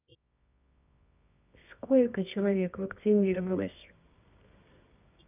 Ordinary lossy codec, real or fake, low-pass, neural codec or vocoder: none; fake; 3.6 kHz; codec, 24 kHz, 0.9 kbps, WavTokenizer, medium music audio release